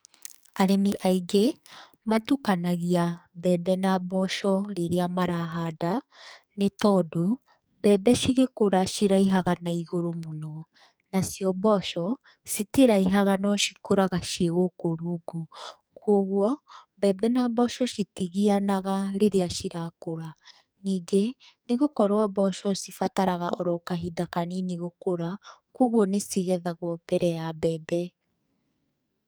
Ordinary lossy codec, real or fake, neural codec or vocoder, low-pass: none; fake; codec, 44.1 kHz, 2.6 kbps, SNAC; none